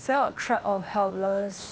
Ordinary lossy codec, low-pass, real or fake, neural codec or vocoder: none; none; fake; codec, 16 kHz, 0.8 kbps, ZipCodec